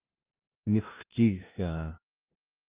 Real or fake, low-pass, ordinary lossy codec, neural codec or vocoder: fake; 3.6 kHz; Opus, 24 kbps; codec, 16 kHz, 0.5 kbps, FunCodec, trained on LibriTTS, 25 frames a second